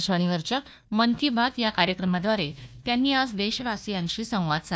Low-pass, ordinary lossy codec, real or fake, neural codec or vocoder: none; none; fake; codec, 16 kHz, 1 kbps, FunCodec, trained on Chinese and English, 50 frames a second